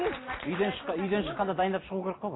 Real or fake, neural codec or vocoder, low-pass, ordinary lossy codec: real; none; 7.2 kHz; AAC, 16 kbps